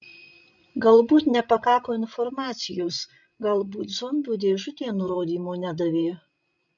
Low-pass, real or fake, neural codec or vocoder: 7.2 kHz; fake; codec, 16 kHz, 16 kbps, FreqCodec, larger model